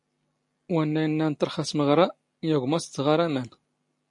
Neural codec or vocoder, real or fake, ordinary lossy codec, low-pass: none; real; MP3, 48 kbps; 10.8 kHz